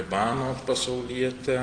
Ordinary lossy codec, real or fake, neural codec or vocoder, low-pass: Opus, 24 kbps; real; none; 9.9 kHz